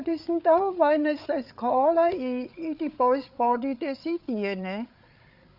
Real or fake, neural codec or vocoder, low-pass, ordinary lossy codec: fake; codec, 16 kHz, 8 kbps, FreqCodec, larger model; 5.4 kHz; none